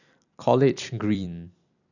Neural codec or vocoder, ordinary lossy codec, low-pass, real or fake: vocoder, 44.1 kHz, 128 mel bands every 256 samples, BigVGAN v2; none; 7.2 kHz; fake